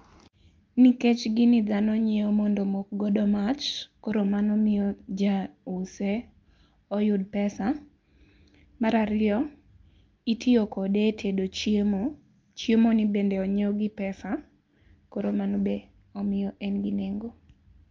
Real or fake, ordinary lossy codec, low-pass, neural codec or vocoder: real; Opus, 24 kbps; 7.2 kHz; none